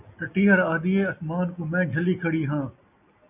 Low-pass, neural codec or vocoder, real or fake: 3.6 kHz; none; real